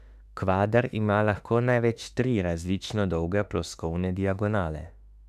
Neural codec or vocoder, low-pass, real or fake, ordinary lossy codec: autoencoder, 48 kHz, 32 numbers a frame, DAC-VAE, trained on Japanese speech; 14.4 kHz; fake; none